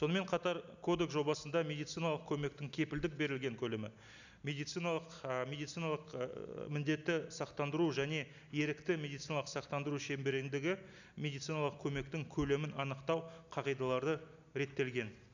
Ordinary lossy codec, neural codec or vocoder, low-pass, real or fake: none; none; 7.2 kHz; real